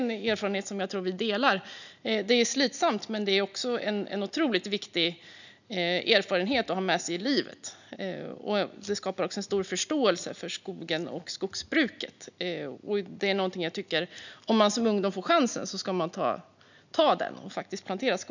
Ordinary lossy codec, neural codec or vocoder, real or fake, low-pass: none; none; real; 7.2 kHz